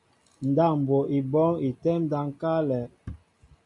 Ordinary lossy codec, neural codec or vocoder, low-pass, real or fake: MP3, 96 kbps; none; 10.8 kHz; real